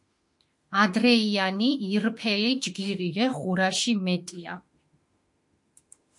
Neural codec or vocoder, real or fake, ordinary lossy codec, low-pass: autoencoder, 48 kHz, 32 numbers a frame, DAC-VAE, trained on Japanese speech; fake; MP3, 48 kbps; 10.8 kHz